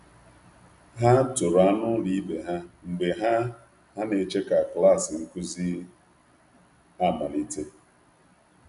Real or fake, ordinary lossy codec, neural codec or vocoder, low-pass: real; none; none; 10.8 kHz